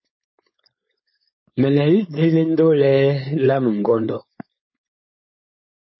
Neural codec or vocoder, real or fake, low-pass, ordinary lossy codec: codec, 16 kHz, 4.8 kbps, FACodec; fake; 7.2 kHz; MP3, 24 kbps